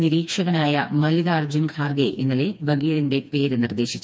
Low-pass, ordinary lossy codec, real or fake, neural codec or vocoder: none; none; fake; codec, 16 kHz, 2 kbps, FreqCodec, smaller model